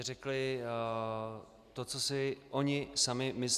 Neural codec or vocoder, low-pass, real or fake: none; 14.4 kHz; real